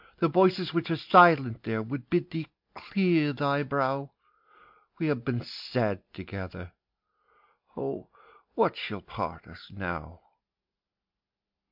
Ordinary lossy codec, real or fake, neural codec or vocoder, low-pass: MP3, 48 kbps; real; none; 5.4 kHz